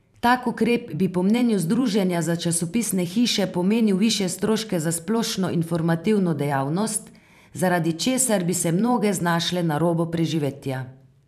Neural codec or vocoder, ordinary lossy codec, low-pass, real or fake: vocoder, 44.1 kHz, 128 mel bands every 512 samples, BigVGAN v2; none; 14.4 kHz; fake